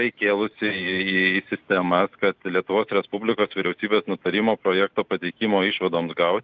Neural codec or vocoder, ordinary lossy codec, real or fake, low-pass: none; Opus, 32 kbps; real; 7.2 kHz